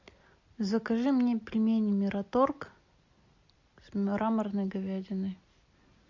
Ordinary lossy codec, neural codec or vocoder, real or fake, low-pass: MP3, 64 kbps; none; real; 7.2 kHz